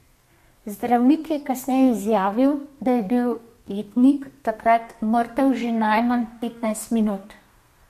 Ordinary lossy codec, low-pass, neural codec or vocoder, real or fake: MP3, 64 kbps; 14.4 kHz; codec, 32 kHz, 1.9 kbps, SNAC; fake